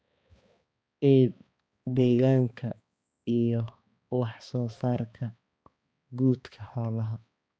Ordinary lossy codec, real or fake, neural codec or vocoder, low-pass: none; fake; codec, 16 kHz, 2 kbps, X-Codec, HuBERT features, trained on balanced general audio; none